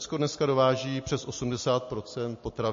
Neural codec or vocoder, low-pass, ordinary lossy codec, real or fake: none; 7.2 kHz; MP3, 32 kbps; real